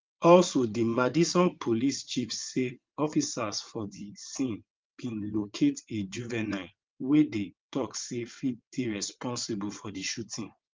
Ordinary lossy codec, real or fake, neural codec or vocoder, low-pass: Opus, 32 kbps; fake; vocoder, 22.05 kHz, 80 mel bands, WaveNeXt; 7.2 kHz